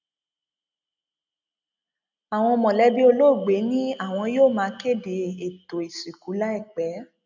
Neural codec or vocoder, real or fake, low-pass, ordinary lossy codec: none; real; 7.2 kHz; none